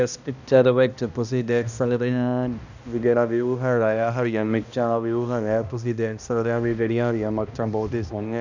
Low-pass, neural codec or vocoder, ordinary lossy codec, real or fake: 7.2 kHz; codec, 16 kHz, 1 kbps, X-Codec, HuBERT features, trained on balanced general audio; none; fake